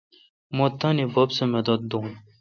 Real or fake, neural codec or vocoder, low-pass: real; none; 7.2 kHz